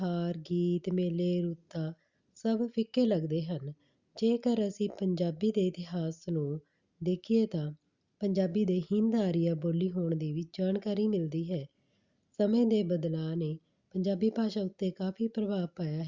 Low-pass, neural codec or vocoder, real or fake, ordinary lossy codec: 7.2 kHz; none; real; Opus, 64 kbps